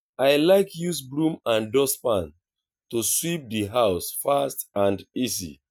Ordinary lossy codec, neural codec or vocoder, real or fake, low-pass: none; none; real; 19.8 kHz